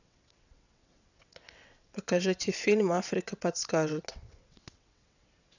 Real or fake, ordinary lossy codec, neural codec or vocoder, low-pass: fake; none; vocoder, 22.05 kHz, 80 mel bands, WaveNeXt; 7.2 kHz